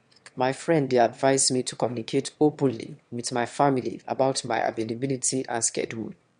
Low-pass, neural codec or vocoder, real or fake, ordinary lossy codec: 9.9 kHz; autoencoder, 22.05 kHz, a latent of 192 numbers a frame, VITS, trained on one speaker; fake; MP3, 64 kbps